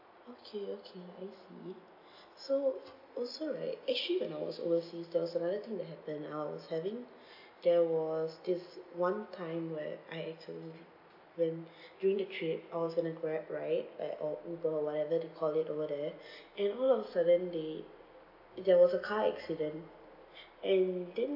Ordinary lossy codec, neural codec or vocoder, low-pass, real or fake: AAC, 48 kbps; none; 5.4 kHz; real